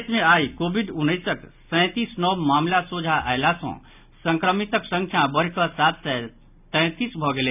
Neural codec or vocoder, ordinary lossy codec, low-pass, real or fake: none; none; 3.6 kHz; real